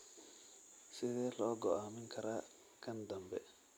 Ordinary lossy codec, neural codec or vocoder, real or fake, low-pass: none; none; real; none